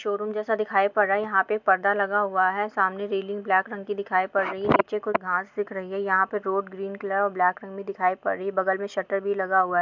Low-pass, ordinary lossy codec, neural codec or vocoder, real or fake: 7.2 kHz; MP3, 64 kbps; none; real